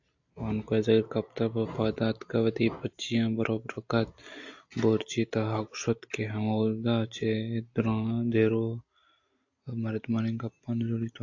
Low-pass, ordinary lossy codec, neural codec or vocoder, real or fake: 7.2 kHz; AAC, 48 kbps; none; real